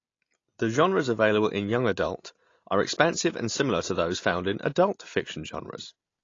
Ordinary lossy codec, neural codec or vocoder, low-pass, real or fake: AAC, 32 kbps; none; 7.2 kHz; real